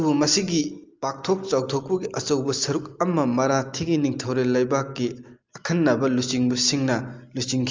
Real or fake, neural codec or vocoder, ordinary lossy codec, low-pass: real; none; Opus, 32 kbps; 7.2 kHz